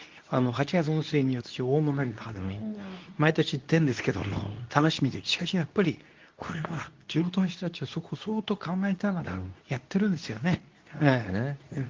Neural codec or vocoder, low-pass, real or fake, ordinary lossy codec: codec, 24 kHz, 0.9 kbps, WavTokenizer, medium speech release version 2; 7.2 kHz; fake; Opus, 24 kbps